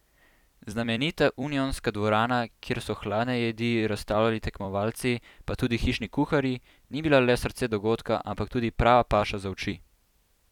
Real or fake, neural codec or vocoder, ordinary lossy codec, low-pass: fake; vocoder, 44.1 kHz, 128 mel bands every 256 samples, BigVGAN v2; none; 19.8 kHz